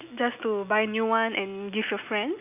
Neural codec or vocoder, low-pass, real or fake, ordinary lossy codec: none; 3.6 kHz; real; none